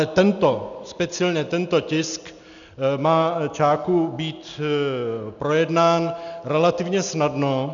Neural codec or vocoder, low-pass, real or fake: none; 7.2 kHz; real